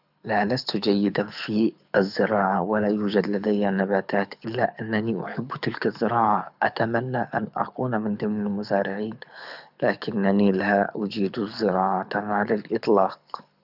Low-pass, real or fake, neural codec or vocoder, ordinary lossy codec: 5.4 kHz; fake; codec, 24 kHz, 6 kbps, HILCodec; none